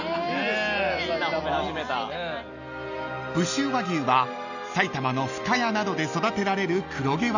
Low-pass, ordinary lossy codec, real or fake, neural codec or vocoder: 7.2 kHz; none; real; none